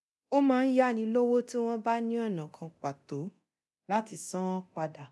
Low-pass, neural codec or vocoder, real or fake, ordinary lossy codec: none; codec, 24 kHz, 0.9 kbps, DualCodec; fake; none